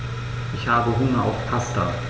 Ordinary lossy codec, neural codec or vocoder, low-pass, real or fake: none; none; none; real